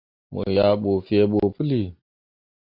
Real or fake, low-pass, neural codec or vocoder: real; 5.4 kHz; none